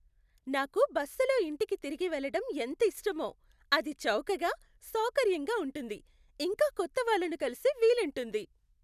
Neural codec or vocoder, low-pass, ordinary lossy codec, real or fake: none; 14.4 kHz; none; real